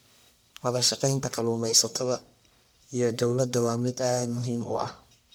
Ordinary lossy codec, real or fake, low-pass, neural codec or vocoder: none; fake; none; codec, 44.1 kHz, 1.7 kbps, Pupu-Codec